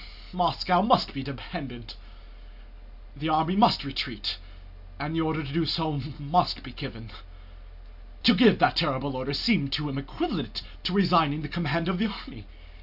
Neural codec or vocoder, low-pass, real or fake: none; 5.4 kHz; real